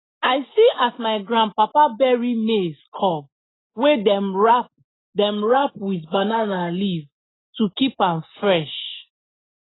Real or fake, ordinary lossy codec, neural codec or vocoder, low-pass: real; AAC, 16 kbps; none; 7.2 kHz